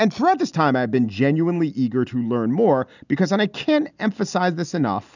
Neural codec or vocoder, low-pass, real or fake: none; 7.2 kHz; real